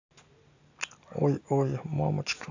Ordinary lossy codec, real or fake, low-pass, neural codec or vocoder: AAC, 48 kbps; real; 7.2 kHz; none